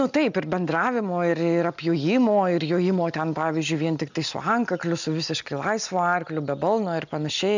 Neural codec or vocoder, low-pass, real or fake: none; 7.2 kHz; real